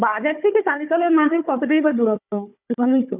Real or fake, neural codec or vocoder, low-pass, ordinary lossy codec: fake; codec, 16 kHz, 4 kbps, FunCodec, trained on Chinese and English, 50 frames a second; 3.6 kHz; none